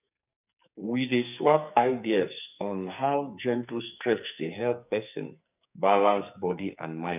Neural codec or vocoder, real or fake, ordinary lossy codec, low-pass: codec, 44.1 kHz, 2.6 kbps, SNAC; fake; none; 3.6 kHz